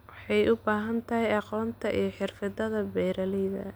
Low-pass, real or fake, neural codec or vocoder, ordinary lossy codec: none; real; none; none